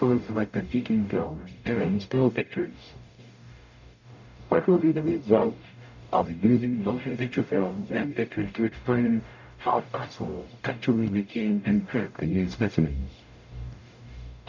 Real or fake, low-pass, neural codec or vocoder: fake; 7.2 kHz; codec, 44.1 kHz, 0.9 kbps, DAC